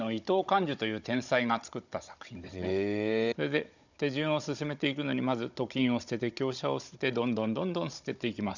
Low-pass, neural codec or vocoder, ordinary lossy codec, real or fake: 7.2 kHz; codec, 16 kHz, 16 kbps, FunCodec, trained on Chinese and English, 50 frames a second; none; fake